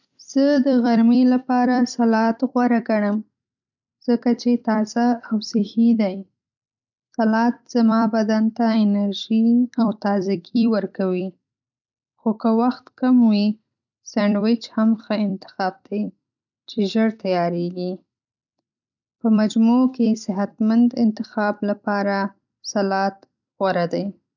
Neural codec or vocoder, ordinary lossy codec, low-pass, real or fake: vocoder, 44.1 kHz, 128 mel bands every 256 samples, BigVGAN v2; none; 7.2 kHz; fake